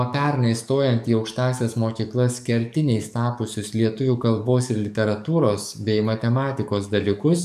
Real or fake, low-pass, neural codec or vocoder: fake; 14.4 kHz; codec, 44.1 kHz, 7.8 kbps, DAC